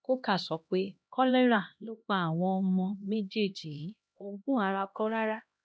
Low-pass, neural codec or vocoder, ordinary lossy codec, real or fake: none; codec, 16 kHz, 1 kbps, X-Codec, HuBERT features, trained on LibriSpeech; none; fake